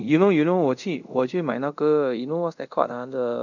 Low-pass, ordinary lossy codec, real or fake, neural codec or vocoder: 7.2 kHz; none; fake; codec, 24 kHz, 0.5 kbps, DualCodec